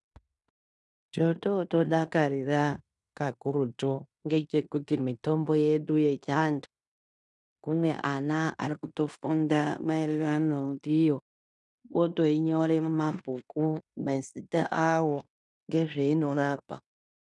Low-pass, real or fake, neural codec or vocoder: 10.8 kHz; fake; codec, 16 kHz in and 24 kHz out, 0.9 kbps, LongCat-Audio-Codec, fine tuned four codebook decoder